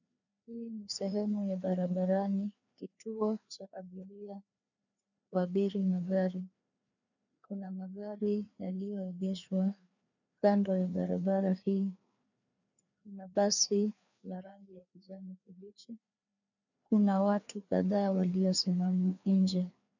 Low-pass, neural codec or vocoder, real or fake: 7.2 kHz; codec, 16 kHz, 2 kbps, FreqCodec, larger model; fake